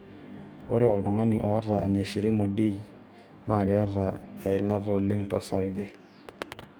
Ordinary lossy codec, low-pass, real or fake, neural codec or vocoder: none; none; fake; codec, 44.1 kHz, 2.6 kbps, DAC